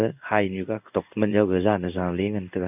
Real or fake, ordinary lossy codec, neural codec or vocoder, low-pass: fake; none; codec, 16 kHz in and 24 kHz out, 1 kbps, XY-Tokenizer; 3.6 kHz